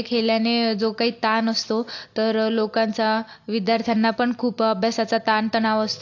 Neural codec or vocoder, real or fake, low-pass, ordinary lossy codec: none; real; 7.2 kHz; AAC, 48 kbps